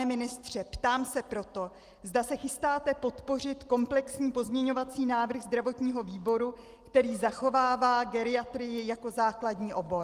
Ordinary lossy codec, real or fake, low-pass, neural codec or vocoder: Opus, 24 kbps; real; 14.4 kHz; none